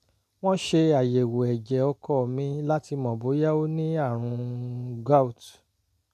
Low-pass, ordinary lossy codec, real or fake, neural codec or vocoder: 14.4 kHz; none; real; none